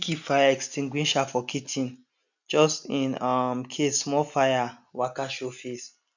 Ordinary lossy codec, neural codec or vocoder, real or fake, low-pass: none; none; real; 7.2 kHz